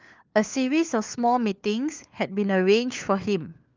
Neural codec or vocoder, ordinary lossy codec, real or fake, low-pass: codec, 16 kHz, 4 kbps, FunCodec, trained on LibriTTS, 50 frames a second; Opus, 24 kbps; fake; 7.2 kHz